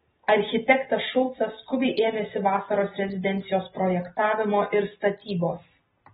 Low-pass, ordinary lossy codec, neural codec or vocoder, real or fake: 9.9 kHz; AAC, 16 kbps; none; real